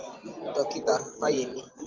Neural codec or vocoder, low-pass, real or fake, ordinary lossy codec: vocoder, 24 kHz, 100 mel bands, Vocos; 7.2 kHz; fake; Opus, 24 kbps